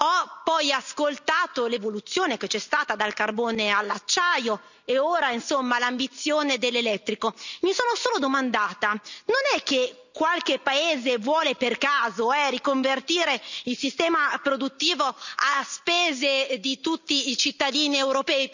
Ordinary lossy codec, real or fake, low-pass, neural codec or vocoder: none; real; 7.2 kHz; none